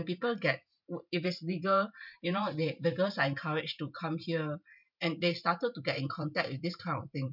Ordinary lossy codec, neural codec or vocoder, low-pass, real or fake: none; vocoder, 44.1 kHz, 128 mel bands every 512 samples, BigVGAN v2; 5.4 kHz; fake